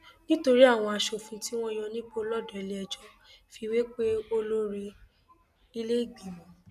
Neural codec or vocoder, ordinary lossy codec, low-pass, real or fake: none; none; 14.4 kHz; real